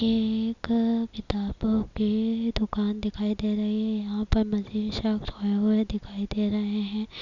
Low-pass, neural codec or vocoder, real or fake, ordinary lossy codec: 7.2 kHz; none; real; none